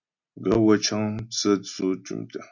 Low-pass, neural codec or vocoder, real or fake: 7.2 kHz; none; real